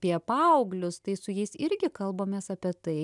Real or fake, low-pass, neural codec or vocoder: real; 10.8 kHz; none